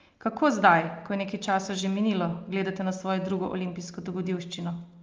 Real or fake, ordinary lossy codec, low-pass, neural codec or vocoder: real; Opus, 24 kbps; 7.2 kHz; none